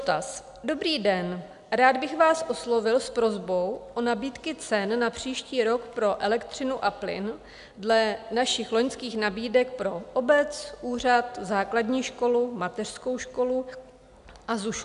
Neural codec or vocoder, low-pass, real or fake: none; 10.8 kHz; real